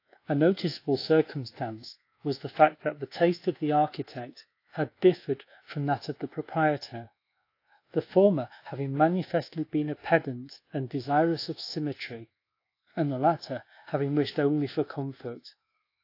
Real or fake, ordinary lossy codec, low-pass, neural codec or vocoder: fake; AAC, 32 kbps; 5.4 kHz; codec, 24 kHz, 1.2 kbps, DualCodec